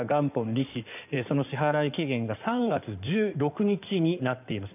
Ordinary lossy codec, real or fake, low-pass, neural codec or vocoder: none; fake; 3.6 kHz; codec, 16 kHz in and 24 kHz out, 2.2 kbps, FireRedTTS-2 codec